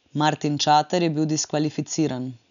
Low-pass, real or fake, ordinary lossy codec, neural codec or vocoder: 7.2 kHz; real; none; none